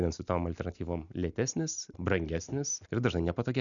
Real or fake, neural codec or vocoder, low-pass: real; none; 7.2 kHz